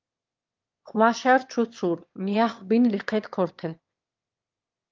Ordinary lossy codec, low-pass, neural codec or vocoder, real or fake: Opus, 24 kbps; 7.2 kHz; autoencoder, 22.05 kHz, a latent of 192 numbers a frame, VITS, trained on one speaker; fake